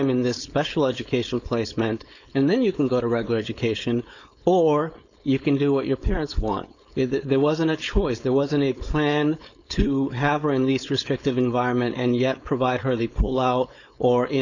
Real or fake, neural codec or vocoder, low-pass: fake; codec, 16 kHz, 4.8 kbps, FACodec; 7.2 kHz